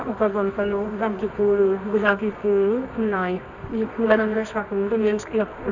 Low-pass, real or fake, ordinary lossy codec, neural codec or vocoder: 7.2 kHz; fake; none; codec, 24 kHz, 0.9 kbps, WavTokenizer, medium music audio release